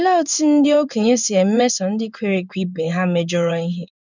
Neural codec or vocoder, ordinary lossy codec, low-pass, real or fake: codec, 16 kHz in and 24 kHz out, 1 kbps, XY-Tokenizer; none; 7.2 kHz; fake